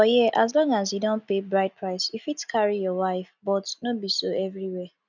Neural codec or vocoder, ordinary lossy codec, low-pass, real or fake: none; none; 7.2 kHz; real